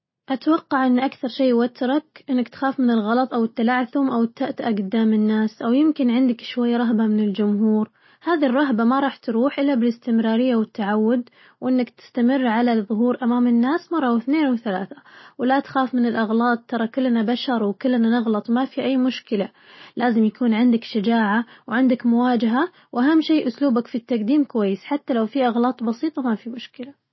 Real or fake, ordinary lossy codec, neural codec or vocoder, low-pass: real; MP3, 24 kbps; none; 7.2 kHz